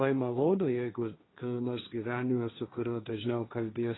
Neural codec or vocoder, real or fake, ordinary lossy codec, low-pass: codec, 16 kHz, 1.1 kbps, Voila-Tokenizer; fake; AAC, 16 kbps; 7.2 kHz